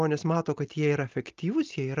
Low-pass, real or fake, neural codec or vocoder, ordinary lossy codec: 7.2 kHz; real; none; Opus, 32 kbps